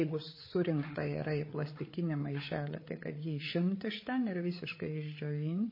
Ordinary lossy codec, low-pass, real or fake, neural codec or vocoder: MP3, 24 kbps; 5.4 kHz; fake; codec, 16 kHz, 8 kbps, FreqCodec, larger model